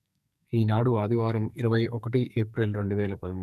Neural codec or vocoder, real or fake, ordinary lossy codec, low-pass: codec, 44.1 kHz, 2.6 kbps, SNAC; fake; none; 14.4 kHz